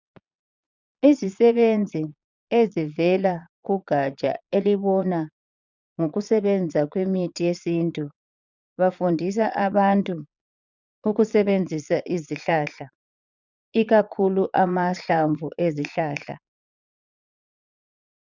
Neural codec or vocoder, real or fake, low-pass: vocoder, 22.05 kHz, 80 mel bands, Vocos; fake; 7.2 kHz